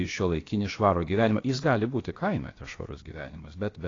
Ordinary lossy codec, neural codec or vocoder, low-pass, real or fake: AAC, 32 kbps; codec, 16 kHz, about 1 kbps, DyCAST, with the encoder's durations; 7.2 kHz; fake